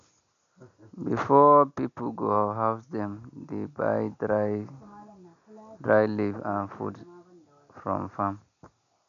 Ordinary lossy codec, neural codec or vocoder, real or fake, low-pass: none; none; real; 7.2 kHz